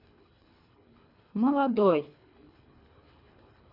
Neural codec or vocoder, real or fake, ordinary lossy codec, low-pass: codec, 24 kHz, 3 kbps, HILCodec; fake; AAC, 32 kbps; 5.4 kHz